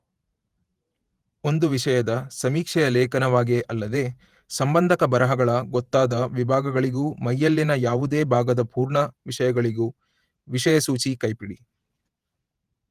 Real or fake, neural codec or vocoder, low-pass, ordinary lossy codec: fake; vocoder, 48 kHz, 128 mel bands, Vocos; 14.4 kHz; Opus, 32 kbps